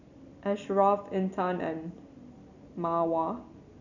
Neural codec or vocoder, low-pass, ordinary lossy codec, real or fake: none; 7.2 kHz; none; real